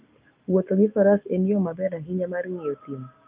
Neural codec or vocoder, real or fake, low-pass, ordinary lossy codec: none; real; 3.6 kHz; Opus, 24 kbps